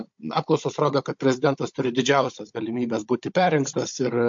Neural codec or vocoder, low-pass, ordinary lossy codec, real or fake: codec, 16 kHz, 16 kbps, FunCodec, trained on Chinese and English, 50 frames a second; 7.2 kHz; MP3, 48 kbps; fake